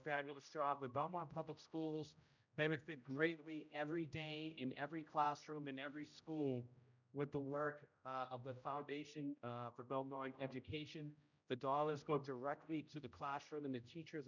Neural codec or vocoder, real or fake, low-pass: codec, 16 kHz, 0.5 kbps, X-Codec, HuBERT features, trained on general audio; fake; 7.2 kHz